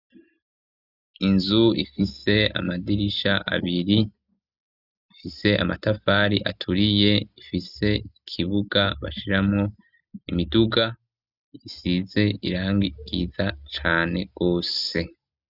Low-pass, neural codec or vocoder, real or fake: 5.4 kHz; none; real